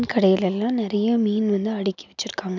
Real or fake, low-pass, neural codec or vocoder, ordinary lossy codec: real; 7.2 kHz; none; none